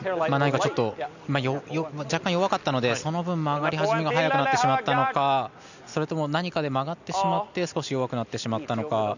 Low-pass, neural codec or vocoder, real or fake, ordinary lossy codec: 7.2 kHz; none; real; none